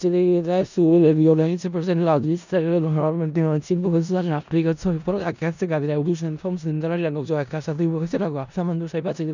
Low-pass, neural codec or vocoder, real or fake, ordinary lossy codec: 7.2 kHz; codec, 16 kHz in and 24 kHz out, 0.4 kbps, LongCat-Audio-Codec, four codebook decoder; fake; none